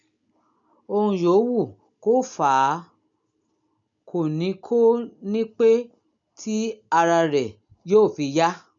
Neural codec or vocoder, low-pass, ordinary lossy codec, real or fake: none; 7.2 kHz; none; real